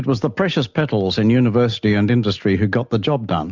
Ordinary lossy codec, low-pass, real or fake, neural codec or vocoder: MP3, 64 kbps; 7.2 kHz; real; none